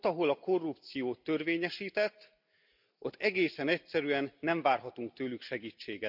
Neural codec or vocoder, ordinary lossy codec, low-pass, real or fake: none; none; 5.4 kHz; real